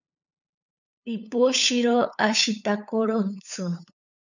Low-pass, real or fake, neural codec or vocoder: 7.2 kHz; fake; codec, 16 kHz, 8 kbps, FunCodec, trained on LibriTTS, 25 frames a second